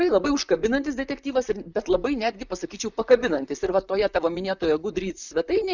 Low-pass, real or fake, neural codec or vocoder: 7.2 kHz; fake; vocoder, 44.1 kHz, 128 mel bands, Pupu-Vocoder